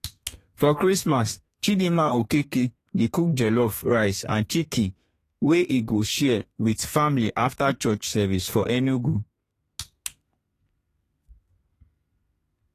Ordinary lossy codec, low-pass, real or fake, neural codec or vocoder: AAC, 48 kbps; 14.4 kHz; fake; codec, 32 kHz, 1.9 kbps, SNAC